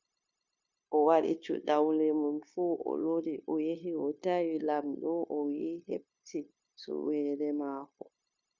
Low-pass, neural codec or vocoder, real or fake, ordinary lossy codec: 7.2 kHz; codec, 16 kHz, 0.9 kbps, LongCat-Audio-Codec; fake; Opus, 64 kbps